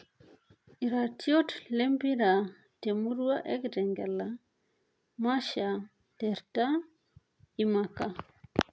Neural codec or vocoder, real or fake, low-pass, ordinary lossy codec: none; real; none; none